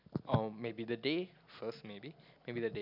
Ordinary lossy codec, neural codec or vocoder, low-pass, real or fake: none; none; 5.4 kHz; real